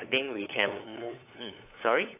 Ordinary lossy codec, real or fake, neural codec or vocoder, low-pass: AAC, 24 kbps; fake; codec, 16 kHz, 8 kbps, FunCodec, trained on LibriTTS, 25 frames a second; 3.6 kHz